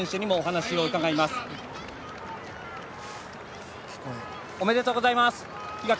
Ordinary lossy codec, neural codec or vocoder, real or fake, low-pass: none; none; real; none